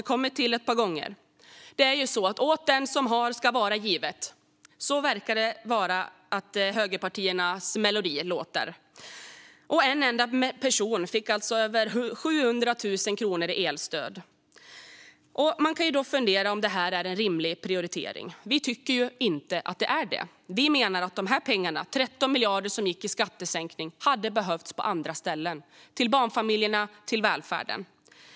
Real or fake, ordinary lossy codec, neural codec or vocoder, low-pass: real; none; none; none